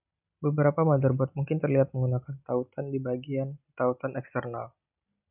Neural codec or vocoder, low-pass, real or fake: none; 3.6 kHz; real